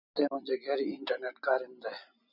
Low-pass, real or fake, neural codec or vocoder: 5.4 kHz; real; none